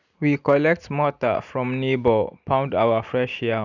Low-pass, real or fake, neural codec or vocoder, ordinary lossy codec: 7.2 kHz; real; none; none